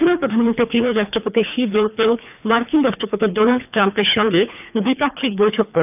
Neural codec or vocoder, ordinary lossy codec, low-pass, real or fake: codec, 24 kHz, 3 kbps, HILCodec; none; 3.6 kHz; fake